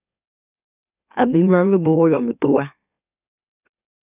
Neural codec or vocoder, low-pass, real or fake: autoencoder, 44.1 kHz, a latent of 192 numbers a frame, MeloTTS; 3.6 kHz; fake